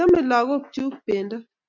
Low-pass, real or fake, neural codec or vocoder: 7.2 kHz; real; none